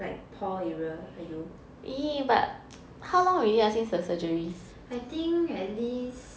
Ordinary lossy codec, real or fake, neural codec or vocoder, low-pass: none; real; none; none